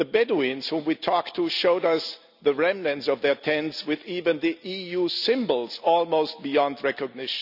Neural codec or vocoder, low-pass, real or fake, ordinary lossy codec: none; 5.4 kHz; real; none